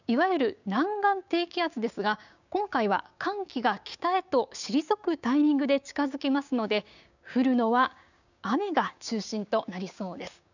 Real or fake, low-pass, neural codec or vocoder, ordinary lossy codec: fake; 7.2 kHz; codec, 16 kHz, 6 kbps, DAC; none